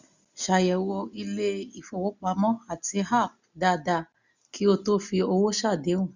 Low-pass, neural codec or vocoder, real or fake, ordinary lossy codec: 7.2 kHz; none; real; none